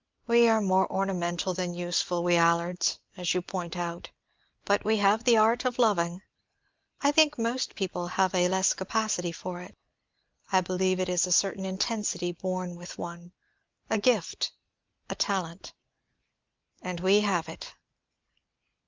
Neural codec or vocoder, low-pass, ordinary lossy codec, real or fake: none; 7.2 kHz; Opus, 24 kbps; real